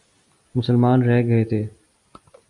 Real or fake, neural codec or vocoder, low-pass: real; none; 10.8 kHz